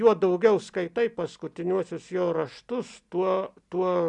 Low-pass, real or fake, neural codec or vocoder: 10.8 kHz; real; none